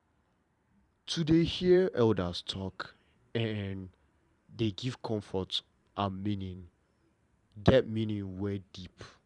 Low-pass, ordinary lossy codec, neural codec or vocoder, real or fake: 10.8 kHz; none; vocoder, 44.1 kHz, 128 mel bands every 512 samples, BigVGAN v2; fake